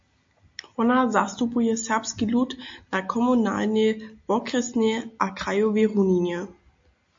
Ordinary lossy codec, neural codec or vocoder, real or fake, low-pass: MP3, 48 kbps; none; real; 7.2 kHz